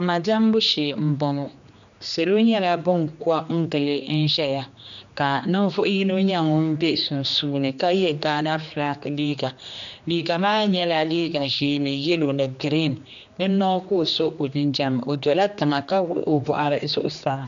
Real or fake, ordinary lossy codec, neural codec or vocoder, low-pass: fake; AAC, 96 kbps; codec, 16 kHz, 2 kbps, X-Codec, HuBERT features, trained on general audio; 7.2 kHz